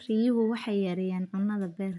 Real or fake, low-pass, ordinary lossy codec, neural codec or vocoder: real; 10.8 kHz; none; none